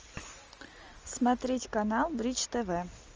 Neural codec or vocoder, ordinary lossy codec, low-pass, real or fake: none; Opus, 24 kbps; 7.2 kHz; real